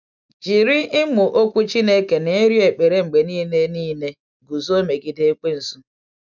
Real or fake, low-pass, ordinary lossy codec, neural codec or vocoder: real; 7.2 kHz; none; none